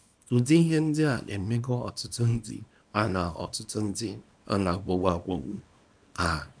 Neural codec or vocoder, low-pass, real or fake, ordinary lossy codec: codec, 24 kHz, 0.9 kbps, WavTokenizer, small release; 9.9 kHz; fake; none